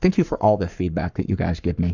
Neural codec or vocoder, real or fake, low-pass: codec, 44.1 kHz, 7.8 kbps, Pupu-Codec; fake; 7.2 kHz